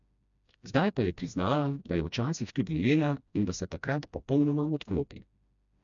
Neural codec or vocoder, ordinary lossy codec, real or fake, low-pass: codec, 16 kHz, 1 kbps, FreqCodec, smaller model; none; fake; 7.2 kHz